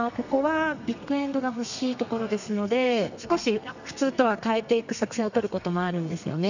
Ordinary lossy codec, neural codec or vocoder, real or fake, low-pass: none; codec, 32 kHz, 1.9 kbps, SNAC; fake; 7.2 kHz